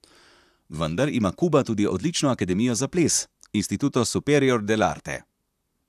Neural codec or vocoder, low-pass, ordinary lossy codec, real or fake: vocoder, 44.1 kHz, 128 mel bands, Pupu-Vocoder; 14.4 kHz; none; fake